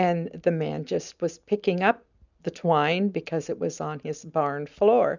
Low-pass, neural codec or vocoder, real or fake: 7.2 kHz; none; real